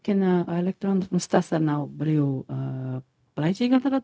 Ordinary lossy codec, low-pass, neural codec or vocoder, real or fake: none; none; codec, 16 kHz, 0.4 kbps, LongCat-Audio-Codec; fake